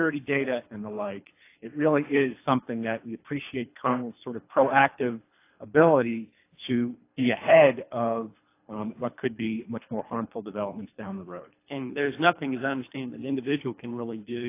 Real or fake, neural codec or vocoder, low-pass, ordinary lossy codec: fake; codec, 24 kHz, 3 kbps, HILCodec; 3.6 kHz; AAC, 24 kbps